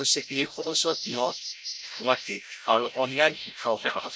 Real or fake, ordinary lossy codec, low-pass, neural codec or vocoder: fake; none; none; codec, 16 kHz, 0.5 kbps, FreqCodec, larger model